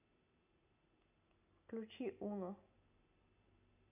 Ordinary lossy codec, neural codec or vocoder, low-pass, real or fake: none; none; 3.6 kHz; real